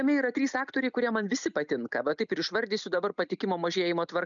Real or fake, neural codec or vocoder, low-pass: real; none; 7.2 kHz